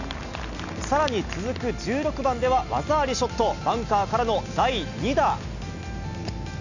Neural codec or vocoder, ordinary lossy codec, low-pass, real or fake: none; none; 7.2 kHz; real